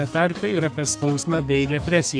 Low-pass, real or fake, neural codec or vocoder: 9.9 kHz; fake; codec, 32 kHz, 1.9 kbps, SNAC